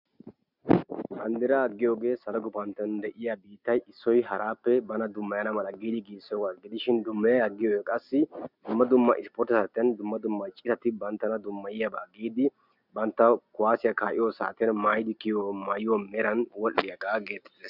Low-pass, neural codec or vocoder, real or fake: 5.4 kHz; none; real